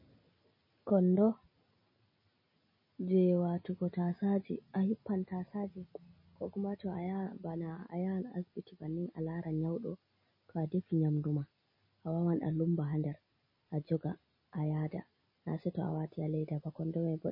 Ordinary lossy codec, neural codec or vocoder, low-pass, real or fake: MP3, 24 kbps; none; 5.4 kHz; real